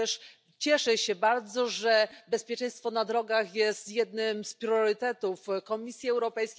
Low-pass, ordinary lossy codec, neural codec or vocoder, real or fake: none; none; none; real